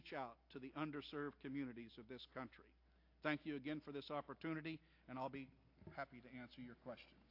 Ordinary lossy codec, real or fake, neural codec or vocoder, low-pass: AAC, 48 kbps; real; none; 5.4 kHz